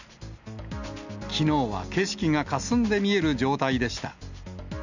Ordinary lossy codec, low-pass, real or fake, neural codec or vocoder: none; 7.2 kHz; real; none